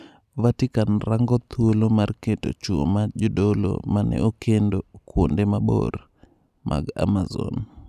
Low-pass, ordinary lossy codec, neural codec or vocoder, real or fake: 14.4 kHz; none; none; real